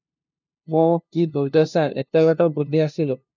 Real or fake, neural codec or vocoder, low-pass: fake; codec, 16 kHz, 0.5 kbps, FunCodec, trained on LibriTTS, 25 frames a second; 7.2 kHz